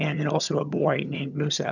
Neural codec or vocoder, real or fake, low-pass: vocoder, 22.05 kHz, 80 mel bands, HiFi-GAN; fake; 7.2 kHz